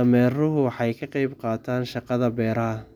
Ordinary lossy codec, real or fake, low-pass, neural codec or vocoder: none; real; 19.8 kHz; none